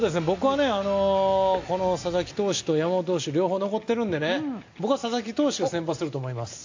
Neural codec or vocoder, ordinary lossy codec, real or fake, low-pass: none; AAC, 48 kbps; real; 7.2 kHz